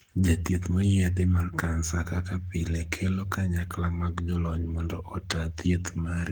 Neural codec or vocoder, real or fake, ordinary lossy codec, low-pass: codec, 44.1 kHz, 7.8 kbps, Pupu-Codec; fake; Opus, 24 kbps; 19.8 kHz